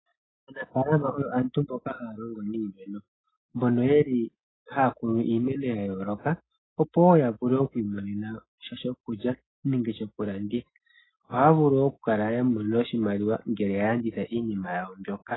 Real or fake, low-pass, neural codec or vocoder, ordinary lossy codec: real; 7.2 kHz; none; AAC, 16 kbps